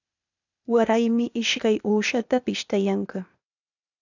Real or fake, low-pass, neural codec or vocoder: fake; 7.2 kHz; codec, 16 kHz, 0.8 kbps, ZipCodec